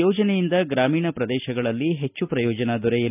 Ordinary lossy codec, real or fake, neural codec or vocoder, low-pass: none; real; none; 3.6 kHz